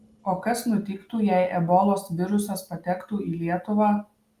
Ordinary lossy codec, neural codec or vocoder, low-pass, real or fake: Opus, 32 kbps; none; 14.4 kHz; real